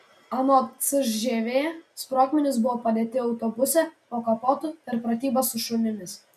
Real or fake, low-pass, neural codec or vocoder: real; 14.4 kHz; none